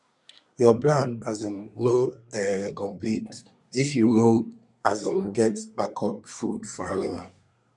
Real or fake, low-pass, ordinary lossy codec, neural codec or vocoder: fake; 10.8 kHz; none; codec, 24 kHz, 1 kbps, SNAC